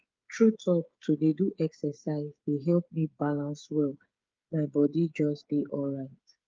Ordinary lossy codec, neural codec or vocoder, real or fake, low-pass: Opus, 24 kbps; codec, 16 kHz, 8 kbps, FreqCodec, smaller model; fake; 7.2 kHz